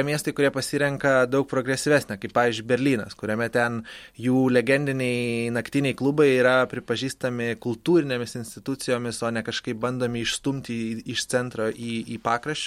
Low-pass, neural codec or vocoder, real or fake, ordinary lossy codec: 19.8 kHz; none; real; MP3, 64 kbps